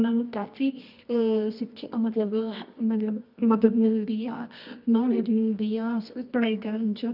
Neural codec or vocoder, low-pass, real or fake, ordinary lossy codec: codec, 24 kHz, 0.9 kbps, WavTokenizer, medium music audio release; 5.4 kHz; fake; none